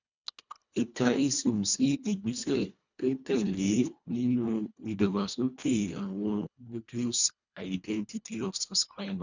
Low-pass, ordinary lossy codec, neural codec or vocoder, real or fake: 7.2 kHz; none; codec, 24 kHz, 1.5 kbps, HILCodec; fake